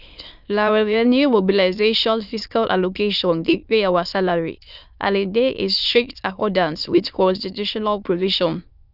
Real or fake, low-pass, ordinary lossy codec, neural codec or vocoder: fake; 5.4 kHz; none; autoencoder, 22.05 kHz, a latent of 192 numbers a frame, VITS, trained on many speakers